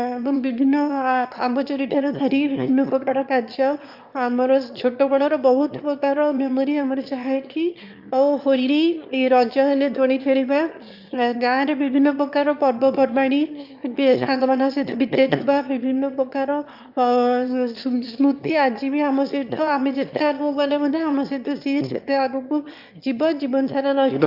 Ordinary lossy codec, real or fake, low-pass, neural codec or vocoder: Opus, 64 kbps; fake; 5.4 kHz; autoencoder, 22.05 kHz, a latent of 192 numbers a frame, VITS, trained on one speaker